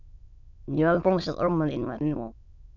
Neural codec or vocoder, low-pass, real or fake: autoencoder, 22.05 kHz, a latent of 192 numbers a frame, VITS, trained on many speakers; 7.2 kHz; fake